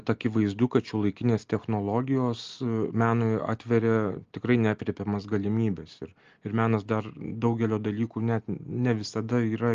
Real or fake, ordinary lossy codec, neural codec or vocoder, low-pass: real; Opus, 32 kbps; none; 7.2 kHz